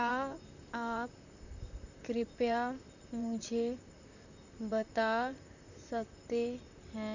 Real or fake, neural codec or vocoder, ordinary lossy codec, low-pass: fake; vocoder, 44.1 kHz, 128 mel bands, Pupu-Vocoder; none; 7.2 kHz